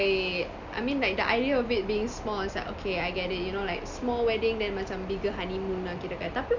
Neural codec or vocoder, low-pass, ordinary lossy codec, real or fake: none; 7.2 kHz; none; real